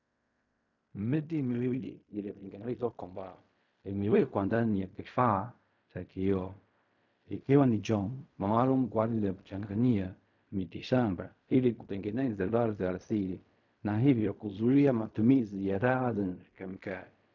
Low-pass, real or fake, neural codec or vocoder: 7.2 kHz; fake; codec, 16 kHz in and 24 kHz out, 0.4 kbps, LongCat-Audio-Codec, fine tuned four codebook decoder